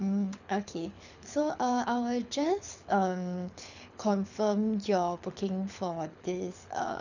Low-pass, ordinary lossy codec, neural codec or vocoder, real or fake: 7.2 kHz; none; codec, 24 kHz, 6 kbps, HILCodec; fake